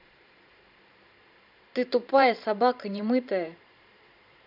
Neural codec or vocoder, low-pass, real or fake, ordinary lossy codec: vocoder, 44.1 kHz, 128 mel bands every 512 samples, BigVGAN v2; 5.4 kHz; fake; none